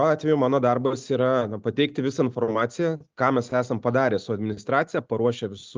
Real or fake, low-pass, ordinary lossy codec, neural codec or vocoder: real; 7.2 kHz; Opus, 24 kbps; none